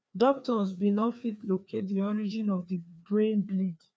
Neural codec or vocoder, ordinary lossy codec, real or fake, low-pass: codec, 16 kHz, 2 kbps, FreqCodec, larger model; none; fake; none